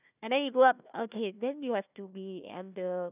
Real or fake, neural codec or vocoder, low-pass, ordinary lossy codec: fake; codec, 16 kHz, 1 kbps, FunCodec, trained on Chinese and English, 50 frames a second; 3.6 kHz; none